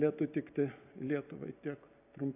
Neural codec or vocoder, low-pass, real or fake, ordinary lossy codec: none; 3.6 kHz; real; AAC, 32 kbps